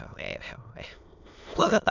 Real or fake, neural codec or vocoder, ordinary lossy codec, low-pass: fake; autoencoder, 22.05 kHz, a latent of 192 numbers a frame, VITS, trained on many speakers; none; 7.2 kHz